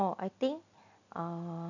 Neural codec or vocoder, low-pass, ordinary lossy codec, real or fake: none; 7.2 kHz; none; real